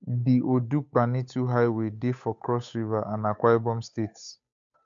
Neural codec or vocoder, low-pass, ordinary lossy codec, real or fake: codec, 16 kHz, 8 kbps, FunCodec, trained on Chinese and English, 25 frames a second; 7.2 kHz; MP3, 64 kbps; fake